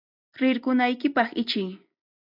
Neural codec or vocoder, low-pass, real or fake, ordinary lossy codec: none; 5.4 kHz; real; AAC, 48 kbps